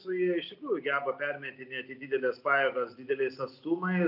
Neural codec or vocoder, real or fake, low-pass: none; real; 5.4 kHz